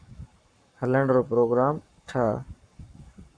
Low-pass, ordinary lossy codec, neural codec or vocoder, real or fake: 9.9 kHz; MP3, 96 kbps; codec, 44.1 kHz, 7.8 kbps, Pupu-Codec; fake